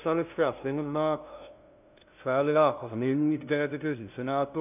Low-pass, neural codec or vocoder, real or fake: 3.6 kHz; codec, 16 kHz, 0.5 kbps, FunCodec, trained on LibriTTS, 25 frames a second; fake